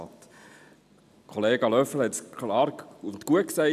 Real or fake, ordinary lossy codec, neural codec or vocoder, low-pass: real; none; none; 14.4 kHz